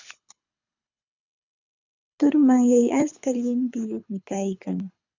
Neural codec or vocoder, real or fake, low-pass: codec, 24 kHz, 6 kbps, HILCodec; fake; 7.2 kHz